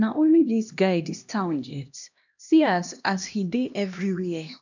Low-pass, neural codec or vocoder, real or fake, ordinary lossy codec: 7.2 kHz; codec, 16 kHz, 1 kbps, X-Codec, HuBERT features, trained on LibriSpeech; fake; none